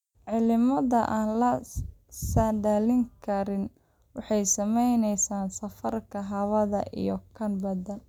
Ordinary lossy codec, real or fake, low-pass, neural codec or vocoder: none; real; 19.8 kHz; none